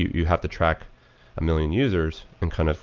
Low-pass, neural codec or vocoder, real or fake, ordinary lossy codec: 7.2 kHz; none; real; Opus, 24 kbps